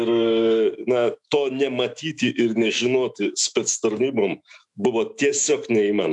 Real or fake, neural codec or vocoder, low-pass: fake; autoencoder, 48 kHz, 128 numbers a frame, DAC-VAE, trained on Japanese speech; 10.8 kHz